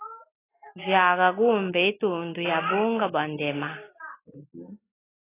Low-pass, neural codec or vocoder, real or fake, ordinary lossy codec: 3.6 kHz; none; real; AAC, 16 kbps